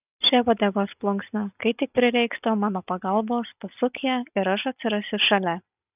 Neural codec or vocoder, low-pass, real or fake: none; 3.6 kHz; real